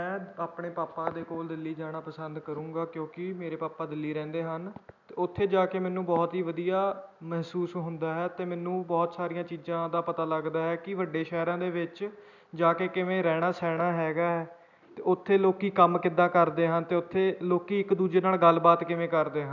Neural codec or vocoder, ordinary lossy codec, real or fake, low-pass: none; none; real; 7.2 kHz